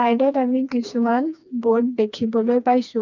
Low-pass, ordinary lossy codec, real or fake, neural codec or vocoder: 7.2 kHz; none; fake; codec, 16 kHz, 2 kbps, FreqCodec, smaller model